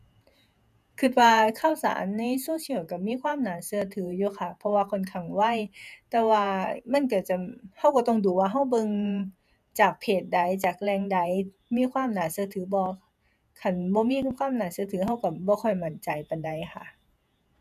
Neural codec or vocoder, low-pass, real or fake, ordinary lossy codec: vocoder, 48 kHz, 128 mel bands, Vocos; 14.4 kHz; fake; none